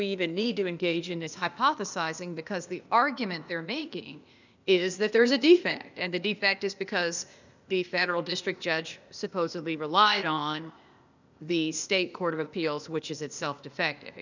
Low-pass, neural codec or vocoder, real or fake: 7.2 kHz; codec, 16 kHz, 0.8 kbps, ZipCodec; fake